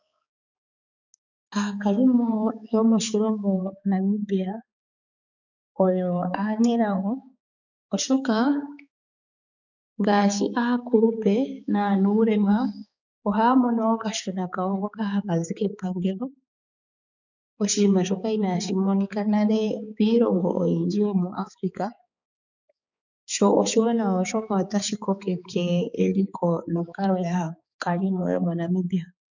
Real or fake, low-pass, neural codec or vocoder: fake; 7.2 kHz; codec, 16 kHz, 4 kbps, X-Codec, HuBERT features, trained on balanced general audio